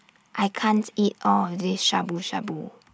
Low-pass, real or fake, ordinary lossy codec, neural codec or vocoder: none; real; none; none